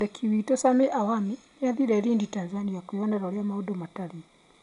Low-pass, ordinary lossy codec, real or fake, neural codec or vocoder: 10.8 kHz; none; real; none